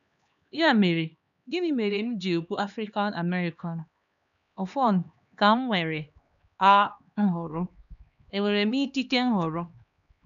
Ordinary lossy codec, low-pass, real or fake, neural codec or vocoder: none; 7.2 kHz; fake; codec, 16 kHz, 2 kbps, X-Codec, HuBERT features, trained on LibriSpeech